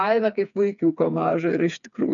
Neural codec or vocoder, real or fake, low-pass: codec, 16 kHz, 4 kbps, FreqCodec, smaller model; fake; 7.2 kHz